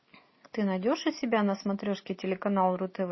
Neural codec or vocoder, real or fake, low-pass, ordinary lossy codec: none; real; 7.2 kHz; MP3, 24 kbps